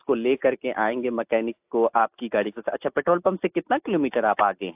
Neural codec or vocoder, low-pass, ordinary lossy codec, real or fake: none; 3.6 kHz; AAC, 32 kbps; real